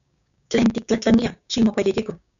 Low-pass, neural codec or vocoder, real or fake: 7.2 kHz; codec, 16 kHz, 6 kbps, DAC; fake